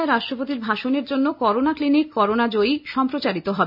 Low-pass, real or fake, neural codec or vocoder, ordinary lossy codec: 5.4 kHz; real; none; none